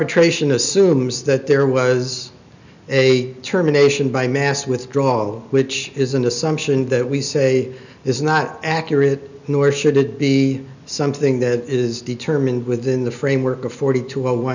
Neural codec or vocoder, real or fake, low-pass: none; real; 7.2 kHz